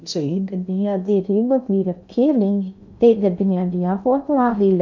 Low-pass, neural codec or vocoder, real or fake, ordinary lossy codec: 7.2 kHz; codec, 16 kHz in and 24 kHz out, 0.6 kbps, FocalCodec, streaming, 2048 codes; fake; none